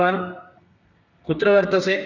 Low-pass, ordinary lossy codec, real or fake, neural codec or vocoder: 7.2 kHz; none; fake; codec, 32 kHz, 1.9 kbps, SNAC